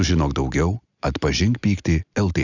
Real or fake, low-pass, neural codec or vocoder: real; 7.2 kHz; none